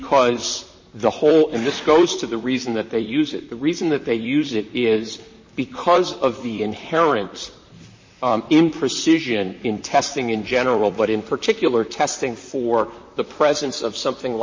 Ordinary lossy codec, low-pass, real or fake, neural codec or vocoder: MP3, 32 kbps; 7.2 kHz; fake; vocoder, 44.1 kHz, 128 mel bands, Pupu-Vocoder